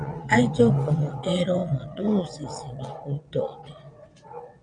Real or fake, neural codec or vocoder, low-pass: fake; vocoder, 22.05 kHz, 80 mel bands, WaveNeXt; 9.9 kHz